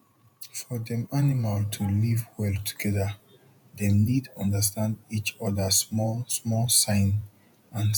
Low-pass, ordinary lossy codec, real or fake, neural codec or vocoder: 19.8 kHz; none; fake; vocoder, 48 kHz, 128 mel bands, Vocos